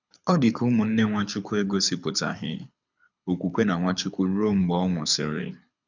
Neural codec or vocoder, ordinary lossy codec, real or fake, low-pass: codec, 24 kHz, 6 kbps, HILCodec; none; fake; 7.2 kHz